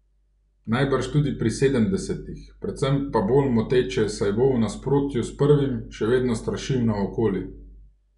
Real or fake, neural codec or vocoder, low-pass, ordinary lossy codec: real; none; 10.8 kHz; none